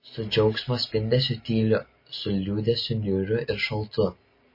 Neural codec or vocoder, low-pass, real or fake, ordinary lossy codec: none; 5.4 kHz; real; MP3, 24 kbps